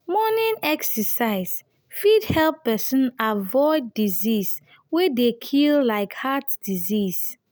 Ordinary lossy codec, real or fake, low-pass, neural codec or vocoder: none; real; none; none